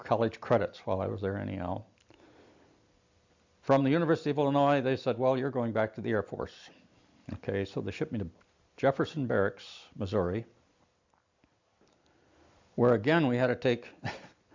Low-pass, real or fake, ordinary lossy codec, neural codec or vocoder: 7.2 kHz; real; MP3, 64 kbps; none